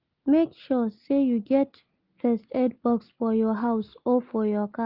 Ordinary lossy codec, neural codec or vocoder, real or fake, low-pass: Opus, 32 kbps; none; real; 5.4 kHz